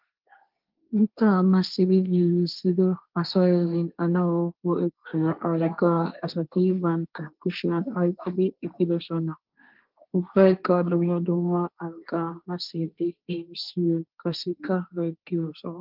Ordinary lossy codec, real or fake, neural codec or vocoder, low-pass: Opus, 32 kbps; fake; codec, 16 kHz, 1.1 kbps, Voila-Tokenizer; 5.4 kHz